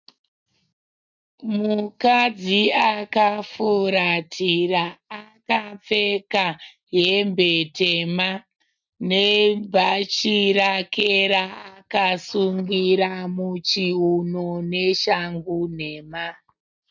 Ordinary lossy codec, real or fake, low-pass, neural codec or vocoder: MP3, 64 kbps; real; 7.2 kHz; none